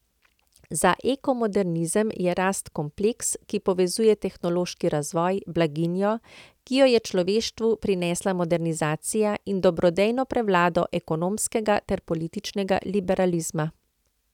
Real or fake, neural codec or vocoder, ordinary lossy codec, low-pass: real; none; none; 19.8 kHz